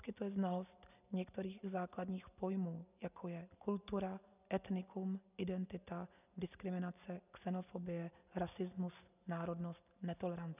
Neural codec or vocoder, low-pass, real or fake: none; 3.6 kHz; real